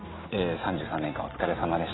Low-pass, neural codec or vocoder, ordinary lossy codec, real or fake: 7.2 kHz; none; AAC, 16 kbps; real